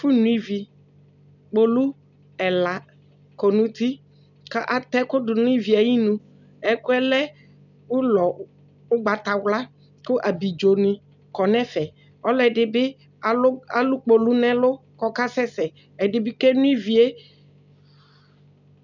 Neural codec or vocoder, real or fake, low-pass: none; real; 7.2 kHz